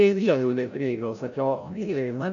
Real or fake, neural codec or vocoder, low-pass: fake; codec, 16 kHz, 0.5 kbps, FreqCodec, larger model; 7.2 kHz